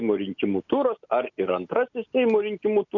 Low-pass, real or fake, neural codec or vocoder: 7.2 kHz; real; none